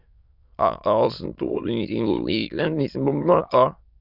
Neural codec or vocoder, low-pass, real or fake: autoencoder, 22.05 kHz, a latent of 192 numbers a frame, VITS, trained on many speakers; 5.4 kHz; fake